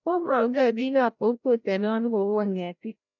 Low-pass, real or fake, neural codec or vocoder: 7.2 kHz; fake; codec, 16 kHz, 0.5 kbps, FreqCodec, larger model